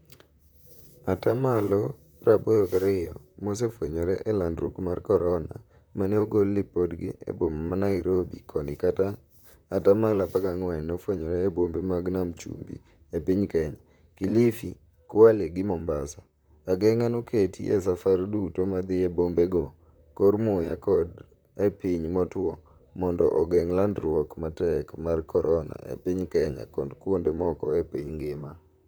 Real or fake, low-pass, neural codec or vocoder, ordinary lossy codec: fake; none; vocoder, 44.1 kHz, 128 mel bands, Pupu-Vocoder; none